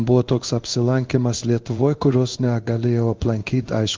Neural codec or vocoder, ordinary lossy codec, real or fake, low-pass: codec, 16 kHz in and 24 kHz out, 1 kbps, XY-Tokenizer; Opus, 32 kbps; fake; 7.2 kHz